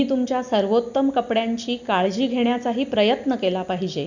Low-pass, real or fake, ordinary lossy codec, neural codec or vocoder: 7.2 kHz; real; none; none